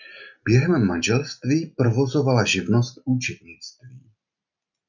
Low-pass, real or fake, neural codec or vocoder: 7.2 kHz; real; none